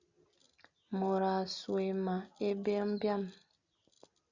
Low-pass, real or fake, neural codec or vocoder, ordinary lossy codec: 7.2 kHz; real; none; Opus, 64 kbps